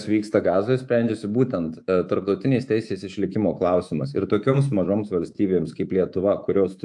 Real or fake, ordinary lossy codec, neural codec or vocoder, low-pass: fake; AAC, 64 kbps; codec, 24 kHz, 3.1 kbps, DualCodec; 10.8 kHz